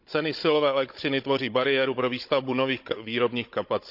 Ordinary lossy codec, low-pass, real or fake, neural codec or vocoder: none; 5.4 kHz; fake; codec, 16 kHz, 16 kbps, FunCodec, trained on Chinese and English, 50 frames a second